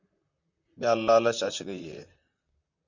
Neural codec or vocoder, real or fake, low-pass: vocoder, 44.1 kHz, 128 mel bands, Pupu-Vocoder; fake; 7.2 kHz